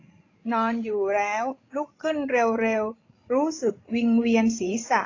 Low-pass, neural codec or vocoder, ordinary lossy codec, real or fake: 7.2 kHz; codec, 16 kHz, 16 kbps, FreqCodec, larger model; AAC, 32 kbps; fake